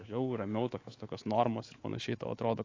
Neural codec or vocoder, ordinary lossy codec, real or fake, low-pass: codec, 24 kHz, 3.1 kbps, DualCodec; AAC, 32 kbps; fake; 7.2 kHz